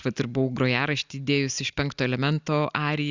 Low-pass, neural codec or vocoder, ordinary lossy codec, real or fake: 7.2 kHz; none; Opus, 64 kbps; real